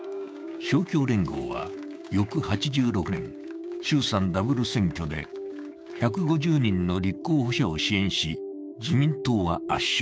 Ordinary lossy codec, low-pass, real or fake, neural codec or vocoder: none; none; fake; codec, 16 kHz, 6 kbps, DAC